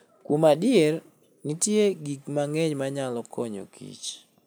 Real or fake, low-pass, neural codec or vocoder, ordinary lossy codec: real; none; none; none